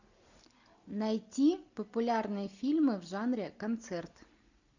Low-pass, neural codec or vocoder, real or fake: 7.2 kHz; none; real